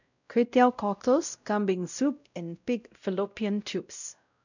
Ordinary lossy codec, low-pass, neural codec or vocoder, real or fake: none; 7.2 kHz; codec, 16 kHz, 0.5 kbps, X-Codec, WavLM features, trained on Multilingual LibriSpeech; fake